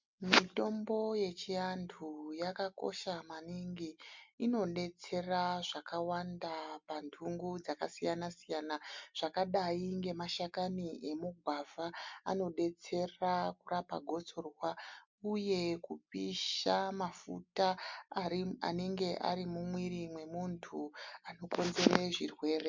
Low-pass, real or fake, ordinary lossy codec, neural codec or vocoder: 7.2 kHz; real; MP3, 64 kbps; none